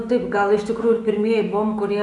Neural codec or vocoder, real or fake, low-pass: autoencoder, 48 kHz, 128 numbers a frame, DAC-VAE, trained on Japanese speech; fake; 10.8 kHz